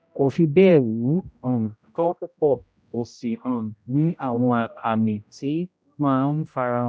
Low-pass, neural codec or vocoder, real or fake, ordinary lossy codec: none; codec, 16 kHz, 0.5 kbps, X-Codec, HuBERT features, trained on general audio; fake; none